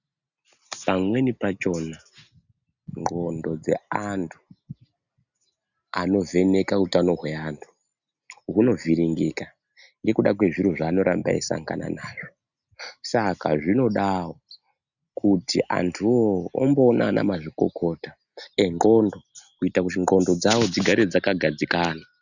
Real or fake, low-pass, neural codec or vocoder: real; 7.2 kHz; none